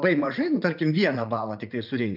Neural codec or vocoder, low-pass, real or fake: vocoder, 44.1 kHz, 80 mel bands, Vocos; 5.4 kHz; fake